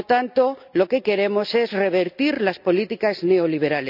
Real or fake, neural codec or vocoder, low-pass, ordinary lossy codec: real; none; 5.4 kHz; none